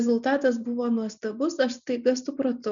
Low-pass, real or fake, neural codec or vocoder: 7.2 kHz; real; none